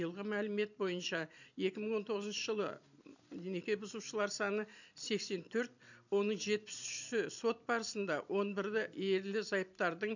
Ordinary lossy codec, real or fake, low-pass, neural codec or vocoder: none; fake; 7.2 kHz; vocoder, 44.1 kHz, 128 mel bands every 256 samples, BigVGAN v2